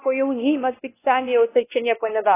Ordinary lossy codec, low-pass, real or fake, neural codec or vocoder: AAC, 16 kbps; 3.6 kHz; fake; codec, 16 kHz, 1 kbps, X-Codec, WavLM features, trained on Multilingual LibriSpeech